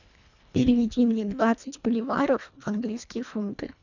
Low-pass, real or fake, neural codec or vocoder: 7.2 kHz; fake; codec, 24 kHz, 1.5 kbps, HILCodec